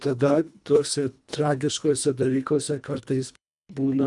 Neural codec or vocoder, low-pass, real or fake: codec, 24 kHz, 1.5 kbps, HILCodec; 10.8 kHz; fake